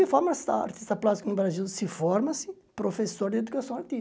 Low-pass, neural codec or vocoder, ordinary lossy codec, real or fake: none; none; none; real